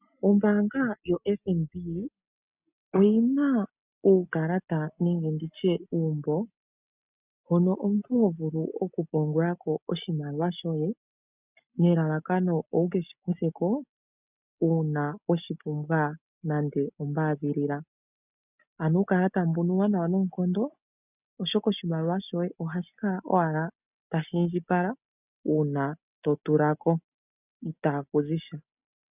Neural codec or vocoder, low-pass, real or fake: none; 3.6 kHz; real